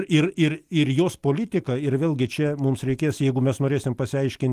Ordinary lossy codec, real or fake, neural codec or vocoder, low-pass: Opus, 24 kbps; real; none; 14.4 kHz